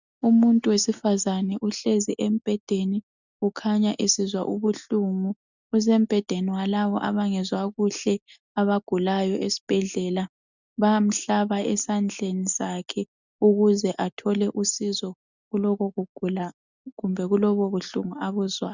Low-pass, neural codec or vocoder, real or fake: 7.2 kHz; none; real